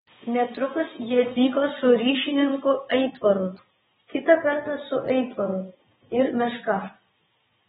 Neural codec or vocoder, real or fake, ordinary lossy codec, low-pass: vocoder, 22.05 kHz, 80 mel bands, WaveNeXt; fake; AAC, 16 kbps; 9.9 kHz